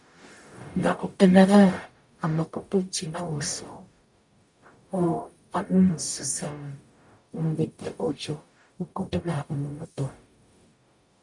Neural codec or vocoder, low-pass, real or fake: codec, 44.1 kHz, 0.9 kbps, DAC; 10.8 kHz; fake